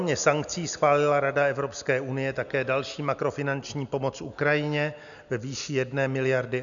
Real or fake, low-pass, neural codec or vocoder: real; 7.2 kHz; none